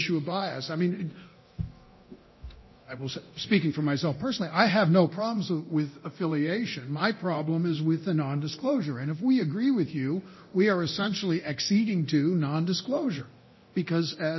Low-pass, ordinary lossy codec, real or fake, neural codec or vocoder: 7.2 kHz; MP3, 24 kbps; fake; codec, 24 kHz, 0.9 kbps, DualCodec